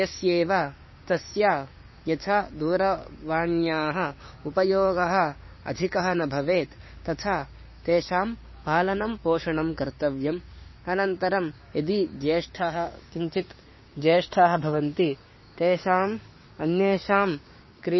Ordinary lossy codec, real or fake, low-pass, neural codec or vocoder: MP3, 24 kbps; fake; 7.2 kHz; codec, 16 kHz, 6 kbps, DAC